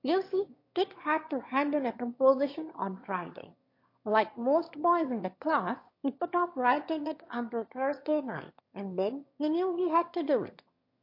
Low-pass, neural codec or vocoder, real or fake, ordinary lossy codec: 5.4 kHz; autoencoder, 22.05 kHz, a latent of 192 numbers a frame, VITS, trained on one speaker; fake; MP3, 32 kbps